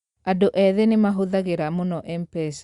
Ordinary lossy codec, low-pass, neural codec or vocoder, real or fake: Opus, 64 kbps; 10.8 kHz; none; real